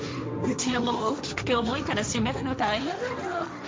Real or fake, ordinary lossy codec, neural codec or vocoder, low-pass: fake; none; codec, 16 kHz, 1.1 kbps, Voila-Tokenizer; none